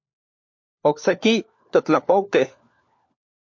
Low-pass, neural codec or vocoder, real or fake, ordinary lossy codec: 7.2 kHz; codec, 16 kHz, 4 kbps, FunCodec, trained on LibriTTS, 50 frames a second; fake; MP3, 48 kbps